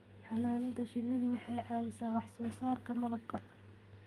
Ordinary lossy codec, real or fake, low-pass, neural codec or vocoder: Opus, 32 kbps; fake; 14.4 kHz; codec, 32 kHz, 1.9 kbps, SNAC